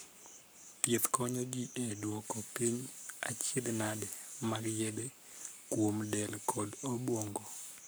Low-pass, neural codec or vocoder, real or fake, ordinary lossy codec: none; codec, 44.1 kHz, 7.8 kbps, Pupu-Codec; fake; none